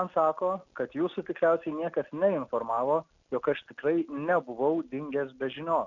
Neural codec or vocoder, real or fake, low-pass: none; real; 7.2 kHz